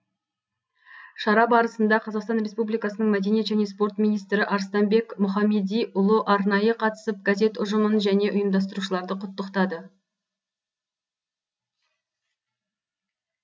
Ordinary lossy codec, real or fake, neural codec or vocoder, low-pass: none; real; none; none